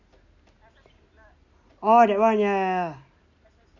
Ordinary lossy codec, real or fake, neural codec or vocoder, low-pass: none; real; none; 7.2 kHz